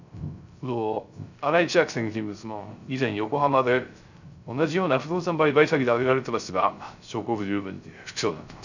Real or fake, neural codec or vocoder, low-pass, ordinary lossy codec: fake; codec, 16 kHz, 0.3 kbps, FocalCodec; 7.2 kHz; none